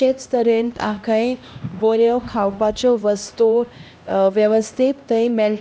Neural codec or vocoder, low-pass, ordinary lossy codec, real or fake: codec, 16 kHz, 1 kbps, X-Codec, HuBERT features, trained on LibriSpeech; none; none; fake